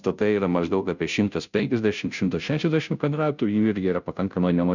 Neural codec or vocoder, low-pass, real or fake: codec, 16 kHz, 0.5 kbps, FunCodec, trained on Chinese and English, 25 frames a second; 7.2 kHz; fake